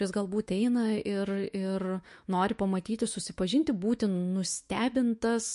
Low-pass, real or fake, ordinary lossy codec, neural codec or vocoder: 14.4 kHz; fake; MP3, 48 kbps; autoencoder, 48 kHz, 128 numbers a frame, DAC-VAE, trained on Japanese speech